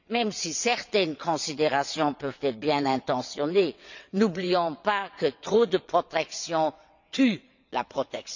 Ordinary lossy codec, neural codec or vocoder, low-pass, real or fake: none; vocoder, 22.05 kHz, 80 mel bands, WaveNeXt; 7.2 kHz; fake